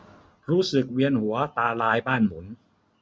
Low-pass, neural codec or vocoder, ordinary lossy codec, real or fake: none; none; none; real